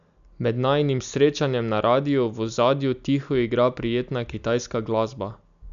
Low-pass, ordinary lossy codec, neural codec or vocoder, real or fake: 7.2 kHz; MP3, 96 kbps; none; real